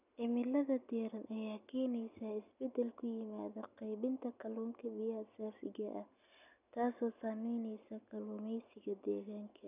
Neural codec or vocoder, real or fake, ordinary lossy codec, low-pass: none; real; none; 3.6 kHz